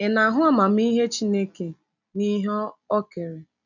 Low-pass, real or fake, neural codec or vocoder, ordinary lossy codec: 7.2 kHz; real; none; none